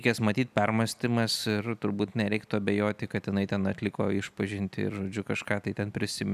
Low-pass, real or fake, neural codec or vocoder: 14.4 kHz; real; none